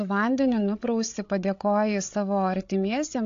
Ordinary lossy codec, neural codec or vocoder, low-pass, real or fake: AAC, 48 kbps; codec, 16 kHz, 8 kbps, FreqCodec, larger model; 7.2 kHz; fake